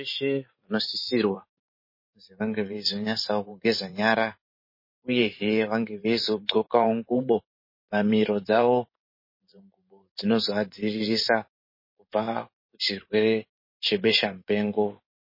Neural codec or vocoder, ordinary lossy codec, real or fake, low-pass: vocoder, 44.1 kHz, 128 mel bands every 512 samples, BigVGAN v2; MP3, 24 kbps; fake; 5.4 kHz